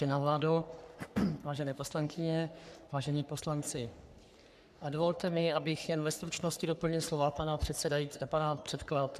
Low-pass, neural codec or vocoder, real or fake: 14.4 kHz; codec, 44.1 kHz, 3.4 kbps, Pupu-Codec; fake